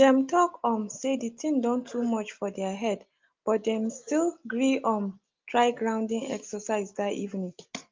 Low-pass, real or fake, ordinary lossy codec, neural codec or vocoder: 7.2 kHz; real; Opus, 24 kbps; none